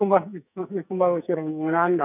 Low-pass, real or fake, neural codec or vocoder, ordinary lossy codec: 3.6 kHz; fake; codec, 44.1 kHz, 2.6 kbps, SNAC; none